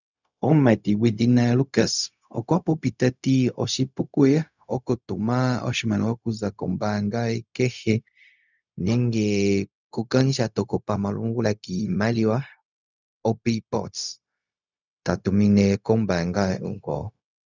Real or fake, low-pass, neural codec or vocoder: fake; 7.2 kHz; codec, 16 kHz, 0.4 kbps, LongCat-Audio-Codec